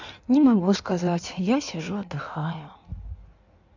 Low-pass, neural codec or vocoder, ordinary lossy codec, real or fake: 7.2 kHz; codec, 16 kHz in and 24 kHz out, 1.1 kbps, FireRedTTS-2 codec; none; fake